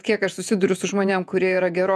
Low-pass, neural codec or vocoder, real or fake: 14.4 kHz; vocoder, 48 kHz, 128 mel bands, Vocos; fake